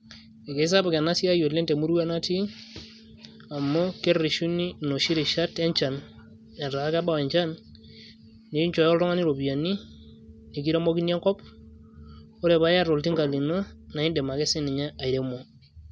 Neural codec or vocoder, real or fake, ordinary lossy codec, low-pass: none; real; none; none